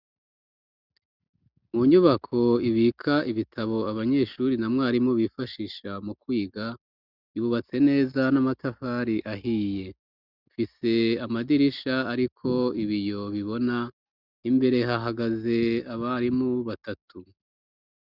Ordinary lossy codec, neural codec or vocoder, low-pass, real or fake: Opus, 64 kbps; none; 5.4 kHz; real